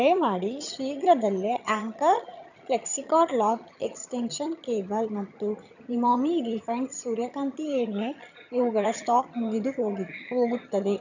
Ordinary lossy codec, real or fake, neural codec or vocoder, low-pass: none; fake; vocoder, 22.05 kHz, 80 mel bands, HiFi-GAN; 7.2 kHz